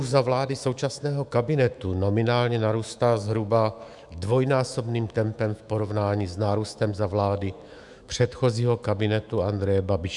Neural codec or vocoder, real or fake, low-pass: autoencoder, 48 kHz, 128 numbers a frame, DAC-VAE, trained on Japanese speech; fake; 10.8 kHz